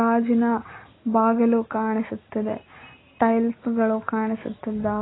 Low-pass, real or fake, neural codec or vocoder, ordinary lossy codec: 7.2 kHz; real; none; AAC, 16 kbps